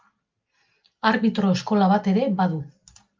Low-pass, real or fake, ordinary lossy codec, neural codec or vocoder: 7.2 kHz; real; Opus, 24 kbps; none